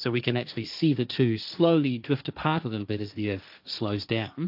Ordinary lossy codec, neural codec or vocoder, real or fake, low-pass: AAC, 48 kbps; codec, 16 kHz, 1.1 kbps, Voila-Tokenizer; fake; 5.4 kHz